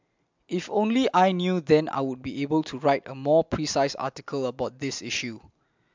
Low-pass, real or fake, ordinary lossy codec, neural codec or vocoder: 7.2 kHz; real; MP3, 64 kbps; none